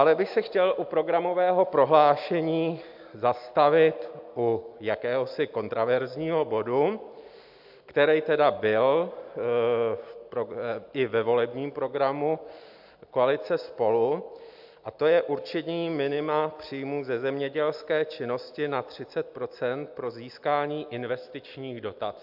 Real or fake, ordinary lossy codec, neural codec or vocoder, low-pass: real; AAC, 48 kbps; none; 5.4 kHz